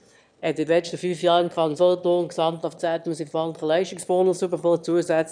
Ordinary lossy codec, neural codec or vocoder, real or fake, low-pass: none; autoencoder, 22.05 kHz, a latent of 192 numbers a frame, VITS, trained on one speaker; fake; 9.9 kHz